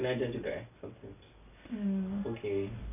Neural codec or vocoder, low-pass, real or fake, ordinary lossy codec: none; 3.6 kHz; real; none